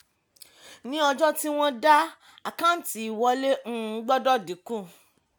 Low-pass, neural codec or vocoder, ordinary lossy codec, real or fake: none; none; none; real